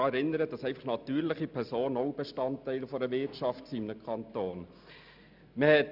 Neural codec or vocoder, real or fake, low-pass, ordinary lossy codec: none; real; 5.4 kHz; none